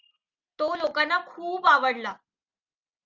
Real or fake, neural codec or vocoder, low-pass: real; none; 7.2 kHz